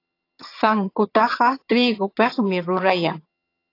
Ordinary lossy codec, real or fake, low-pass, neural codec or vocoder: AAC, 32 kbps; fake; 5.4 kHz; vocoder, 22.05 kHz, 80 mel bands, HiFi-GAN